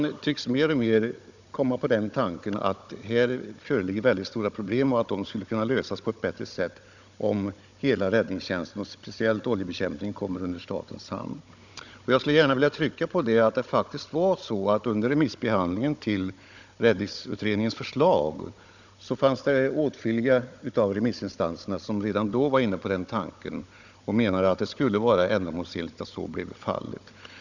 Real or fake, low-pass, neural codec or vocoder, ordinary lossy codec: fake; 7.2 kHz; codec, 16 kHz, 16 kbps, FunCodec, trained on Chinese and English, 50 frames a second; none